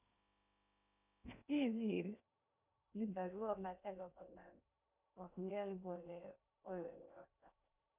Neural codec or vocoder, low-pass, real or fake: codec, 16 kHz in and 24 kHz out, 0.6 kbps, FocalCodec, streaming, 2048 codes; 3.6 kHz; fake